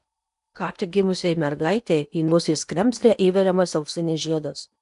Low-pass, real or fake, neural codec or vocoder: 10.8 kHz; fake; codec, 16 kHz in and 24 kHz out, 0.8 kbps, FocalCodec, streaming, 65536 codes